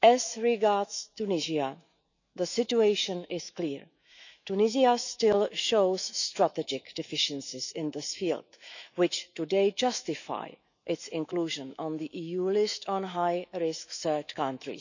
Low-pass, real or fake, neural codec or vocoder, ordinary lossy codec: 7.2 kHz; fake; autoencoder, 48 kHz, 128 numbers a frame, DAC-VAE, trained on Japanese speech; none